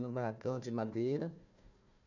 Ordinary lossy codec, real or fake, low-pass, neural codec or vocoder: none; fake; 7.2 kHz; codec, 16 kHz, 1 kbps, FunCodec, trained on Chinese and English, 50 frames a second